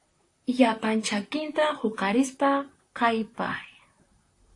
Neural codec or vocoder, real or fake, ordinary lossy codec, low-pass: vocoder, 44.1 kHz, 128 mel bands, Pupu-Vocoder; fake; AAC, 48 kbps; 10.8 kHz